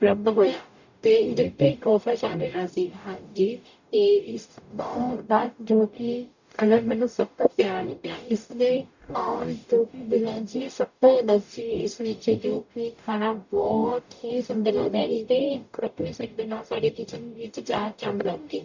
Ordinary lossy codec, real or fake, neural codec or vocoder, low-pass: none; fake; codec, 44.1 kHz, 0.9 kbps, DAC; 7.2 kHz